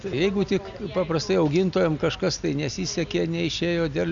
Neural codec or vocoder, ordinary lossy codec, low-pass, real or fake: none; Opus, 64 kbps; 7.2 kHz; real